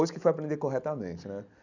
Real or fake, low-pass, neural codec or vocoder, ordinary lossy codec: real; 7.2 kHz; none; none